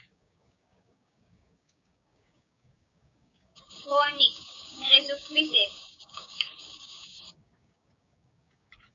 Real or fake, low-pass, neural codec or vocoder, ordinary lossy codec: fake; 7.2 kHz; codec, 16 kHz, 6 kbps, DAC; AAC, 64 kbps